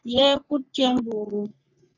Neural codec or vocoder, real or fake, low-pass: codec, 44.1 kHz, 3.4 kbps, Pupu-Codec; fake; 7.2 kHz